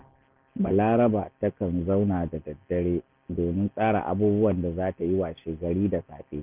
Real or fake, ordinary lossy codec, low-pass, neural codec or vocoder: real; Opus, 24 kbps; 3.6 kHz; none